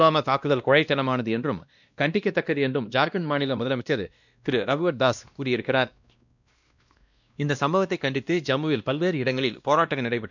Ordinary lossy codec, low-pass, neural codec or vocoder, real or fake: none; 7.2 kHz; codec, 16 kHz, 1 kbps, X-Codec, WavLM features, trained on Multilingual LibriSpeech; fake